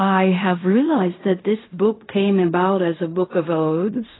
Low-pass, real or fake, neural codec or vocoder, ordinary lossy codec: 7.2 kHz; fake; codec, 16 kHz in and 24 kHz out, 0.4 kbps, LongCat-Audio-Codec, fine tuned four codebook decoder; AAC, 16 kbps